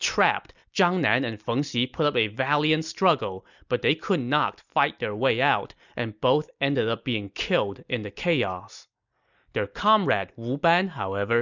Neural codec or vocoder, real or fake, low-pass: none; real; 7.2 kHz